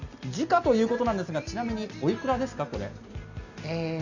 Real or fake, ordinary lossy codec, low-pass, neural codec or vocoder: real; none; 7.2 kHz; none